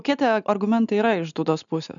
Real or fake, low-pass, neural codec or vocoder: real; 7.2 kHz; none